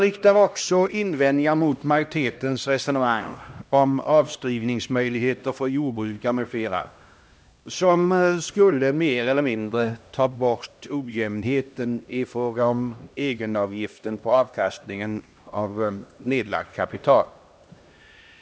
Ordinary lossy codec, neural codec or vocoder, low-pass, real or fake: none; codec, 16 kHz, 1 kbps, X-Codec, HuBERT features, trained on LibriSpeech; none; fake